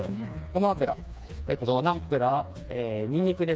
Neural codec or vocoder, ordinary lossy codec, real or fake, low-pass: codec, 16 kHz, 2 kbps, FreqCodec, smaller model; none; fake; none